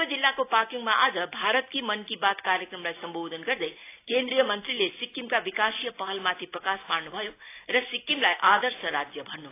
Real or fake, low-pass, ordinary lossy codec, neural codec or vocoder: real; 3.6 kHz; AAC, 24 kbps; none